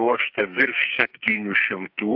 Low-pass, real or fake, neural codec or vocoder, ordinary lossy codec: 5.4 kHz; fake; codec, 44.1 kHz, 2.6 kbps, SNAC; AAC, 48 kbps